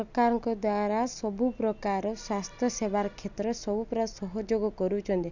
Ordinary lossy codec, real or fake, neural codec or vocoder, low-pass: none; real; none; 7.2 kHz